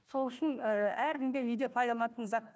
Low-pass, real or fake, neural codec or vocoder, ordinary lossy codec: none; fake; codec, 16 kHz, 1 kbps, FunCodec, trained on Chinese and English, 50 frames a second; none